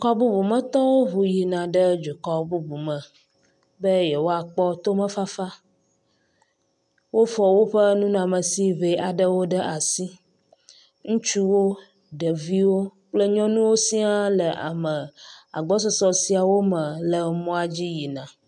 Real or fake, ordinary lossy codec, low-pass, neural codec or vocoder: real; MP3, 96 kbps; 10.8 kHz; none